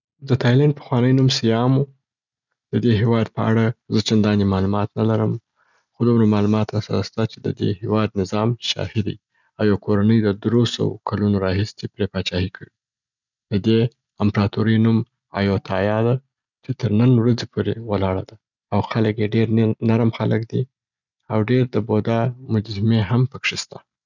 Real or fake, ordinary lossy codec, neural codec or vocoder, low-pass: real; none; none; 7.2 kHz